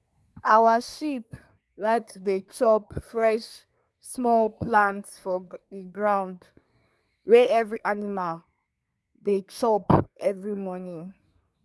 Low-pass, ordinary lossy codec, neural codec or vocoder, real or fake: none; none; codec, 24 kHz, 1 kbps, SNAC; fake